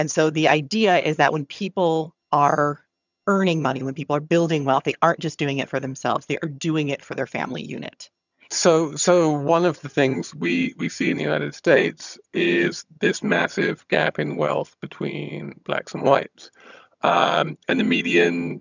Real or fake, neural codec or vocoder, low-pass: fake; vocoder, 22.05 kHz, 80 mel bands, HiFi-GAN; 7.2 kHz